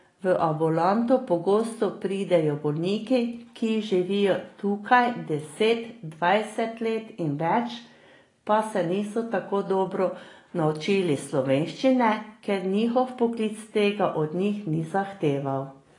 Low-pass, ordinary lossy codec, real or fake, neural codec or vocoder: 10.8 kHz; AAC, 32 kbps; real; none